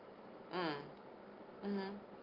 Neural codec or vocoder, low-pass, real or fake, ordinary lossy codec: none; 5.4 kHz; real; Opus, 32 kbps